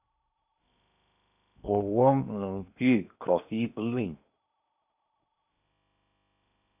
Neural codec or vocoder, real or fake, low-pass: codec, 16 kHz in and 24 kHz out, 0.8 kbps, FocalCodec, streaming, 65536 codes; fake; 3.6 kHz